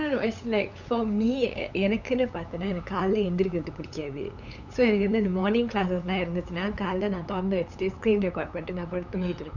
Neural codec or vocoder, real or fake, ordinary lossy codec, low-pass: codec, 16 kHz, 8 kbps, FunCodec, trained on LibriTTS, 25 frames a second; fake; none; 7.2 kHz